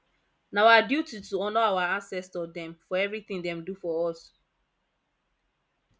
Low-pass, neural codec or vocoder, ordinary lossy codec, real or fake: none; none; none; real